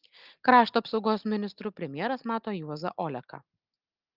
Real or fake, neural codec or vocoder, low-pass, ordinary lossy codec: real; none; 5.4 kHz; Opus, 32 kbps